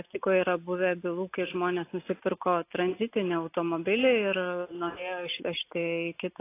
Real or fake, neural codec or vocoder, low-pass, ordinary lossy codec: real; none; 3.6 kHz; AAC, 24 kbps